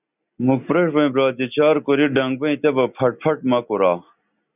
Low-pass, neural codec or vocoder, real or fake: 3.6 kHz; none; real